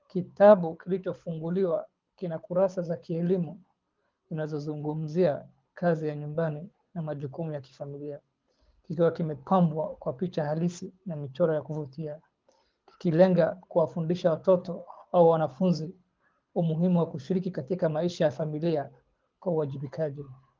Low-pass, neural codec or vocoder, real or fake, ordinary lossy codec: 7.2 kHz; codec, 24 kHz, 6 kbps, HILCodec; fake; Opus, 32 kbps